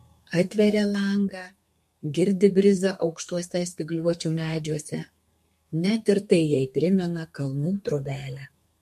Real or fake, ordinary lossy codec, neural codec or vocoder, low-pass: fake; MP3, 64 kbps; codec, 32 kHz, 1.9 kbps, SNAC; 14.4 kHz